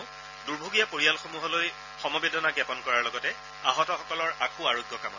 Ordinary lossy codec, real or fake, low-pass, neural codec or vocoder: none; real; 7.2 kHz; none